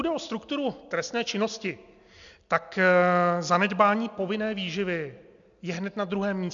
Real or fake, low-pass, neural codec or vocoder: real; 7.2 kHz; none